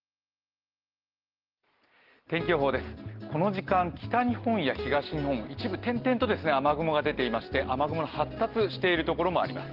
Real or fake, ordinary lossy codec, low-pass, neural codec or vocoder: real; Opus, 16 kbps; 5.4 kHz; none